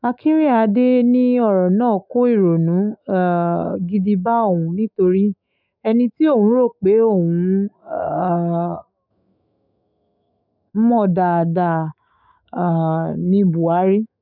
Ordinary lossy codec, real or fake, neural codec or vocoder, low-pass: none; fake; autoencoder, 48 kHz, 128 numbers a frame, DAC-VAE, trained on Japanese speech; 5.4 kHz